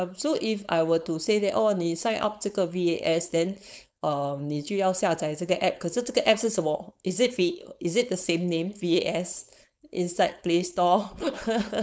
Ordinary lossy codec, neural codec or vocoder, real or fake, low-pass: none; codec, 16 kHz, 4.8 kbps, FACodec; fake; none